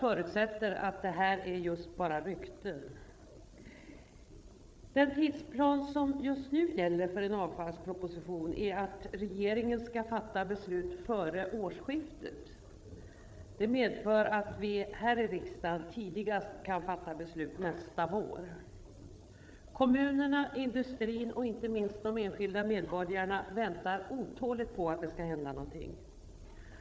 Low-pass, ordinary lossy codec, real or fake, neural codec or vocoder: none; none; fake; codec, 16 kHz, 8 kbps, FreqCodec, larger model